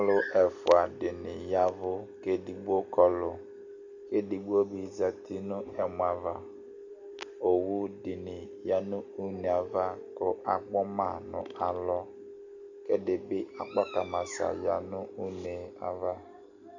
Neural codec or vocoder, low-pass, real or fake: none; 7.2 kHz; real